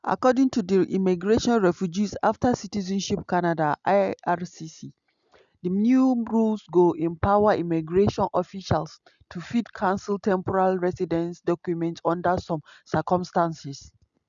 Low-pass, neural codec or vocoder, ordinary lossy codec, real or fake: 7.2 kHz; none; none; real